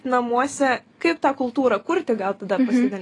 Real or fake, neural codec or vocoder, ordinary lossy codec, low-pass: real; none; AAC, 32 kbps; 10.8 kHz